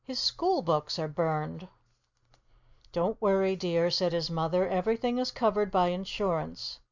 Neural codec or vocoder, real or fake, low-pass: none; real; 7.2 kHz